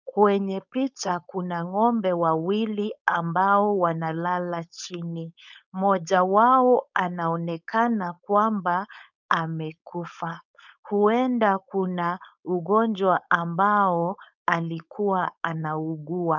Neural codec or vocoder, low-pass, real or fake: codec, 16 kHz, 4.8 kbps, FACodec; 7.2 kHz; fake